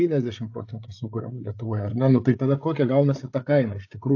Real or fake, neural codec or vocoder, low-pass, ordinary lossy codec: fake; codec, 16 kHz, 8 kbps, FreqCodec, larger model; 7.2 kHz; AAC, 48 kbps